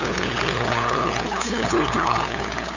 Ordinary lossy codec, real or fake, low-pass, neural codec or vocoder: none; fake; 7.2 kHz; codec, 16 kHz, 8 kbps, FunCodec, trained on LibriTTS, 25 frames a second